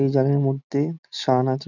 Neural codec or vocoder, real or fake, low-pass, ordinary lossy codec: none; real; 7.2 kHz; none